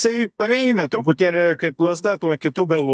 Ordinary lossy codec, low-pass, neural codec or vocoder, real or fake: MP3, 96 kbps; 10.8 kHz; codec, 24 kHz, 0.9 kbps, WavTokenizer, medium music audio release; fake